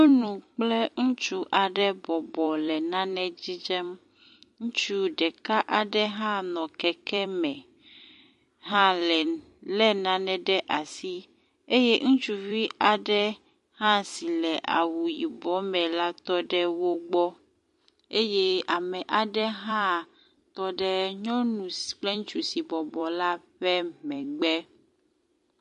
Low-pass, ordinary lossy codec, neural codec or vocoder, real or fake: 14.4 kHz; MP3, 48 kbps; vocoder, 44.1 kHz, 128 mel bands every 256 samples, BigVGAN v2; fake